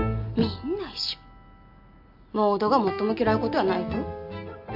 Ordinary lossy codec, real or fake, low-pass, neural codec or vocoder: AAC, 48 kbps; real; 5.4 kHz; none